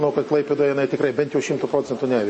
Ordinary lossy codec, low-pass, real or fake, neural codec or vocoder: MP3, 32 kbps; 10.8 kHz; real; none